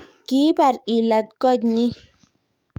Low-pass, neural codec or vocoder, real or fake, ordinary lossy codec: 19.8 kHz; codec, 44.1 kHz, 7.8 kbps, DAC; fake; none